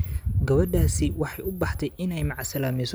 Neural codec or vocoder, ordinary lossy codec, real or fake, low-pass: none; none; real; none